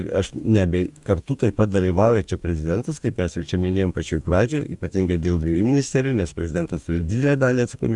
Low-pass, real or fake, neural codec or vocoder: 10.8 kHz; fake; codec, 44.1 kHz, 2.6 kbps, DAC